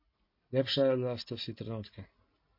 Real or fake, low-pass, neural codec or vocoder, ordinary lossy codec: real; 5.4 kHz; none; MP3, 32 kbps